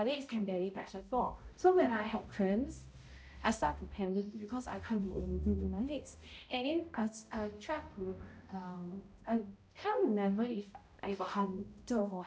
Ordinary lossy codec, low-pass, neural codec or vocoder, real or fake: none; none; codec, 16 kHz, 0.5 kbps, X-Codec, HuBERT features, trained on balanced general audio; fake